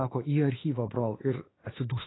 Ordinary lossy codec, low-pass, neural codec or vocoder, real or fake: AAC, 16 kbps; 7.2 kHz; autoencoder, 48 kHz, 32 numbers a frame, DAC-VAE, trained on Japanese speech; fake